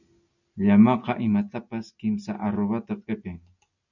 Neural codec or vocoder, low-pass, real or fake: none; 7.2 kHz; real